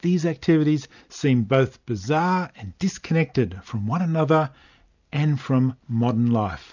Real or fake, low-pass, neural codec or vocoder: real; 7.2 kHz; none